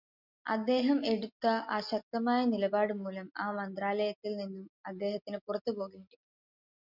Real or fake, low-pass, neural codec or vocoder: real; 5.4 kHz; none